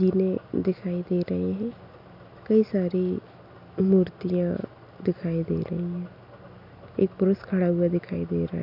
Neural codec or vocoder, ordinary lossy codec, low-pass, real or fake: none; AAC, 48 kbps; 5.4 kHz; real